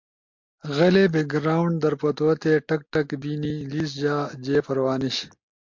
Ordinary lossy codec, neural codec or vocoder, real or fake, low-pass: MP3, 48 kbps; none; real; 7.2 kHz